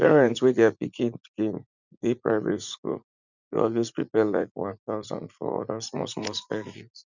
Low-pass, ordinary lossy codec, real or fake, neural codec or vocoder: 7.2 kHz; none; fake; vocoder, 44.1 kHz, 80 mel bands, Vocos